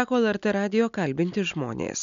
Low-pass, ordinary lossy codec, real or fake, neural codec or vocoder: 7.2 kHz; MP3, 64 kbps; real; none